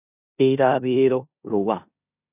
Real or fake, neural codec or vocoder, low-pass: fake; codec, 16 kHz in and 24 kHz out, 0.9 kbps, LongCat-Audio-Codec, four codebook decoder; 3.6 kHz